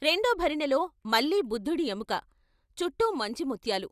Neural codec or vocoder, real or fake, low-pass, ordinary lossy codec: none; real; 14.4 kHz; Opus, 64 kbps